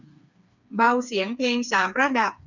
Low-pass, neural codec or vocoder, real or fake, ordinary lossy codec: 7.2 kHz; codec, 16 kHz, 4 kbps, FreqCodec, smaller model; fake; none